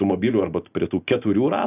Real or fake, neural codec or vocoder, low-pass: real; none; 3.6 kHz